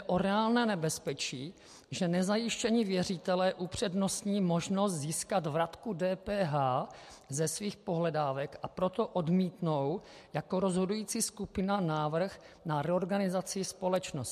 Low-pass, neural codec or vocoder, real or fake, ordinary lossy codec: 14.4 kHz; none; real; MP3, 64 kbps